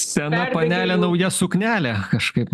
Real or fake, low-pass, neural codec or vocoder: real; 14.4 kHz; none